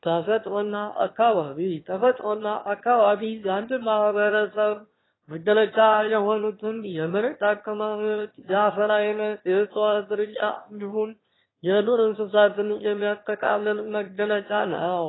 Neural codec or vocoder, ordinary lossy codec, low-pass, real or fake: autoencoder, 22.05 kHz, a latent of 192 numbers a frame, VITS, trained on one speaker; AAC, 16 kbps; 7.2 kHz; fake